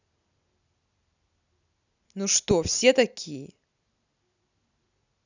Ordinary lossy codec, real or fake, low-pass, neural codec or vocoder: none; real; 7.2 kHz; none